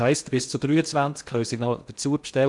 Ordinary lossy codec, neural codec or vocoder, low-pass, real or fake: none; codec, 16 kHz in and 24 kHz out, 0.6 kbps, FocalCodec, streaming, 2048 codes; 10.8 kHz; fake